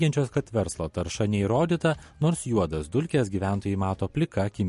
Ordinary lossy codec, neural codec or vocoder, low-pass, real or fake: MP3, 48 kbps; none; 14.4 kHz; real